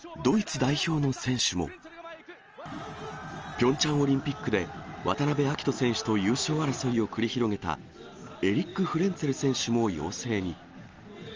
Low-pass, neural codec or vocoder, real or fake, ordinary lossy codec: 7.2 kHz; none; real; Opus, 24 kbps